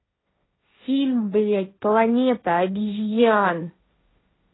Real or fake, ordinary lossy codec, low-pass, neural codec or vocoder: fake; AAC, 16 kbps; 7.2 kHz; codec, 16 kHz, 1.1 kbps, Voila-Tokenizer